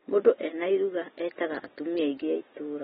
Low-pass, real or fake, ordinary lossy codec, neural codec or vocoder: 7.2 kHz; real; AAC, 16 kbps; none